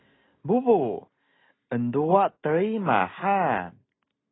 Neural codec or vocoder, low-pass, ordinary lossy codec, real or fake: none; 7.2 kHz; AAC, 16 kbps; real